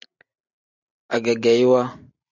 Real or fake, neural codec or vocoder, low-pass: real; none; 7.2 kHz